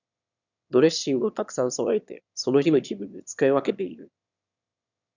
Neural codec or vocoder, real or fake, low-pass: autoencoder, 22.05 kHz, a latent of 192 numbers a frame, VITS, trained on one speaker; fake; 7.2 kHz